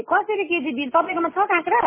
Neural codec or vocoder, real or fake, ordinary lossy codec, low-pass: none; real; MP3, 16 kbps; 3.6 kHz